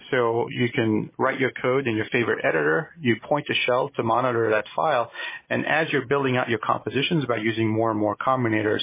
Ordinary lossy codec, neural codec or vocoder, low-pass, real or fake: MP3, 16 kbps; none; 3.6 kHz; real